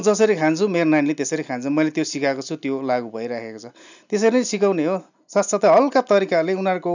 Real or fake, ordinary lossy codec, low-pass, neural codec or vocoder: real; none; 7.2 kHz; none